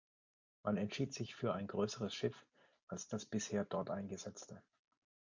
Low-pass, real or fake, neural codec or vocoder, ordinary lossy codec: 7.2 kHz; real; none; MP3, 48 kbps